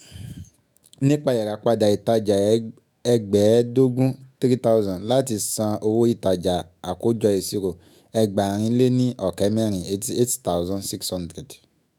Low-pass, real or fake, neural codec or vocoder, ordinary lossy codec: none; fake; autoencoder, 48 kHz, 128 numbers a frame, DAC-VAE, trained on Japanese speech; none